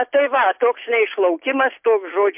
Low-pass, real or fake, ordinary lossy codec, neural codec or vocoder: 3.6 kHz; real; MP3, 32 kbps; none